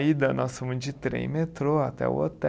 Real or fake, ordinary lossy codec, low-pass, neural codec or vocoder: real; none; none; none